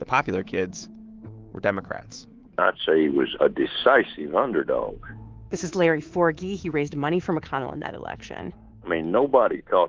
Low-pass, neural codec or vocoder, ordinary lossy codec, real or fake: 7.2 kHz; codec, 24 kHz, 3.1 kbps, DualCodec; Opus, 16 kbps; fake